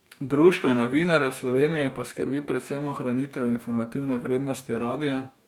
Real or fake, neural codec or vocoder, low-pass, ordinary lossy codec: fake; codec, 44.1 kHz, 2.6 kbps, DAC; 19.8 kHz; none